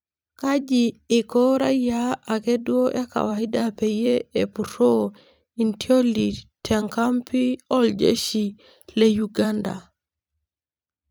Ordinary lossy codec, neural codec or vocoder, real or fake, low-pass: none; vocoder, 44.1 kHz, 128 mel bands every 256 samples, BigVGAN v2; fake; none